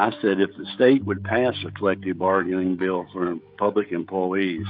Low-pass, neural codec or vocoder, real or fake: 5.4 kHz; codec, 16 kHz, 16 kbps, FreqCodec, smaller model; fake